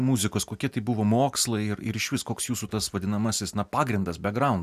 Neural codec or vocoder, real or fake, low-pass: none; real; 14.4 kHz